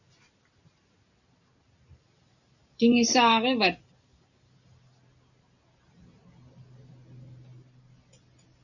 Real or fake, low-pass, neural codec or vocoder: real; 7.2 kHz; none